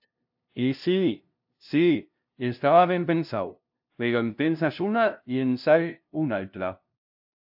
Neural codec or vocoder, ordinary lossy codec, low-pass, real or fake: codec, 16 kHz, 0.5 kbps, FunCodec, trained on LibriTTS, 25 frames a second; AAC, 48 kbps; 5.4 kHz; fake